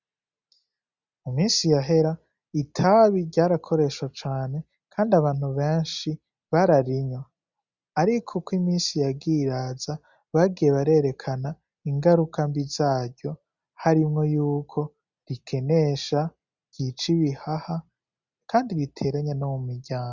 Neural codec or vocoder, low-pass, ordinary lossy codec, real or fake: none; 7.2 kHz; Opus, 64 kbps; real